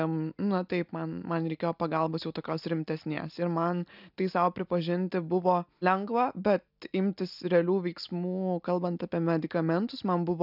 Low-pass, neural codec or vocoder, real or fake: 5.4 kHz; none; real